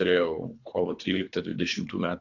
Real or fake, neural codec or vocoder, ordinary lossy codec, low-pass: fake; codec, 24 kHz, 3 kbps, HILCodec; AAC, 48 kbps; 7.2 kHz